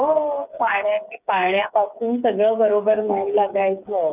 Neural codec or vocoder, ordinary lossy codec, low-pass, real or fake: codec, 16 kHz, 8 kbps, FreqCodec, smaller model; none; 3.6 kHz; fake